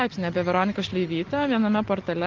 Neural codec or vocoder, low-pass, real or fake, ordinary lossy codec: none; 7.2 kHz; real; Opus, 16 kbps